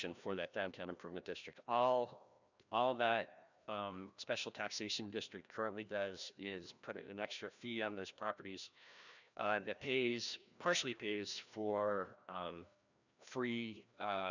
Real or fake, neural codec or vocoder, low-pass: fake; codec, 16 kHz, 1 kbps, FreqCodec, larger model; 7.2 kHz